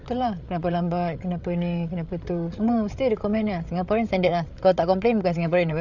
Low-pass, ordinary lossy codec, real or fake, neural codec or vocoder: 7.2 kHz; none; fake; codec, 16 kHz, 8 kbps, FreqCodec, larger model